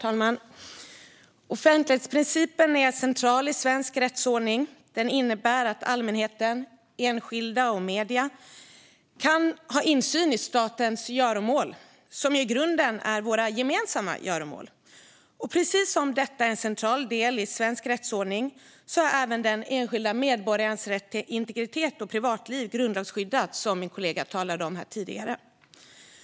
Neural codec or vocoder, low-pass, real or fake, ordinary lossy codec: none; none; real; none